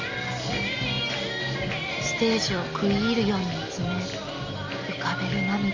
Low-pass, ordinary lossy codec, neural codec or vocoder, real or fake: 7.2 kHz; Opus, 32 kbps; none; real